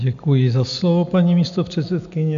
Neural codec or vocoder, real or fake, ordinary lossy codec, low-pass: none; real; MP3, 64 kbps; 7.2 kHz